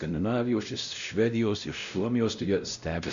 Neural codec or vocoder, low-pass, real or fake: codec, 16 kHz, 0.5 kbps, X-Codec, WavLM features, trained on Multilingual LibriSpeech; 7.2 kHz; fake